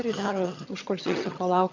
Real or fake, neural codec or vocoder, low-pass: fake; vocoder, 22.05 kHz, 80 mel bands, HiFi-GAN; 7.2 kHz